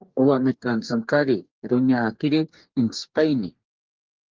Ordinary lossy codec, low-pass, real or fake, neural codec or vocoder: Opus, 24 kbps; 7.2 kHz; fake; codec, 44.1 kHz, 2.6 kbps, DAC